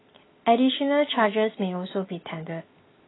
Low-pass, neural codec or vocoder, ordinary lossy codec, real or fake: 7.2 kHz; none; AAC, 16 kbps; real